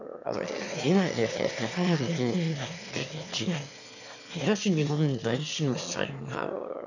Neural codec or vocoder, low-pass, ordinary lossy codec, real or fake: autoencoder, 22.05 kHz, a latent of 192 numbers a frame, VITS, trained on one speaker; 7.2 kHz; none; fake